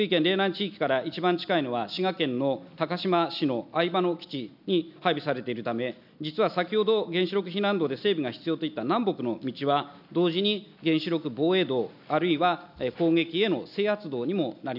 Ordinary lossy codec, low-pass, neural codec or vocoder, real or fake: none; 5.4 kHz; none; real